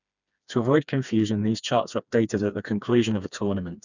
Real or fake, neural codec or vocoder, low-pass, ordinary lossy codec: fake; codec, 16 kHz, 2 kbps, FreqCodec, smaller model; 7.2 kHz; none